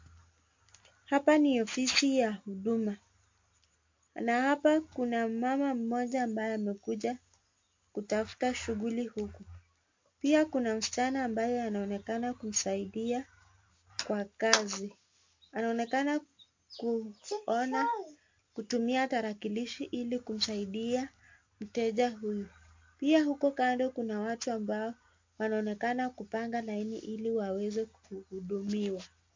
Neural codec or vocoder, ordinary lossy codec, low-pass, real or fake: none; MP3, 48 kbps; 7.2 kHz; real